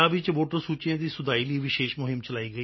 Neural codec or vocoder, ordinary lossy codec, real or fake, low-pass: none; MP3, 24 kbps; real; 7.2 kHz